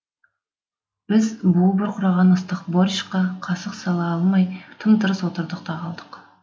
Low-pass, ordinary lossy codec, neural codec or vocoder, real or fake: none; none; none; real